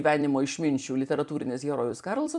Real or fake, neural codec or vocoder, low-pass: fake; vocoder, 44.1 kHz, 128 mel bands every 256 samples, BigVGAN v2; 10.8 kHz